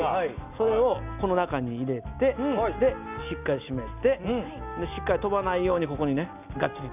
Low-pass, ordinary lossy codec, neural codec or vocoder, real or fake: 3.6 kHz; none; none; real